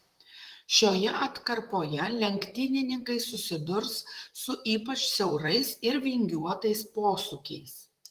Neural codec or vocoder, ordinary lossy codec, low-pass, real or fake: vocoder, 44.1 kHz, 128 mel bands, Pupu-Vocoder; Opus, 24 kbps; 14.4 kHz; fake